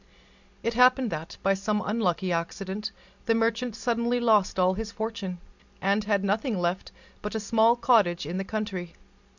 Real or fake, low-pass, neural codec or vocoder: real; 7.2 kHz; none